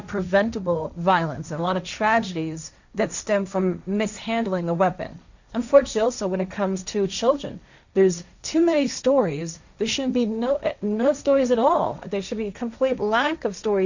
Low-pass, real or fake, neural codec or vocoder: 7.2 kHz; fake; codec, 16 kHz, 1.1 kbps, Voila-Tokenizer